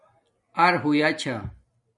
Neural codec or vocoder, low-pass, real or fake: none; 10.8 kHz; real